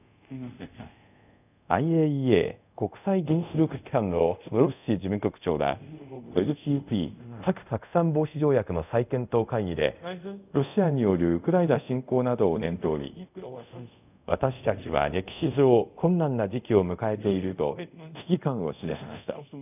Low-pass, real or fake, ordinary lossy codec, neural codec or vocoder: 3.6 kHz; fake; none; codec, 24 kHz, 0.5 kbps, DualCodec